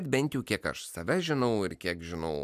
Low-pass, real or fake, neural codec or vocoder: 14.4 kHz; real; none